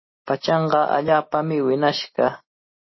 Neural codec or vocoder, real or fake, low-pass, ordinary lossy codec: none; real; 7.2 kHz; MP3, 24 kbps